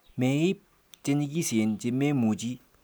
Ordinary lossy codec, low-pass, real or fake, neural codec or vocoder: none; none; real; none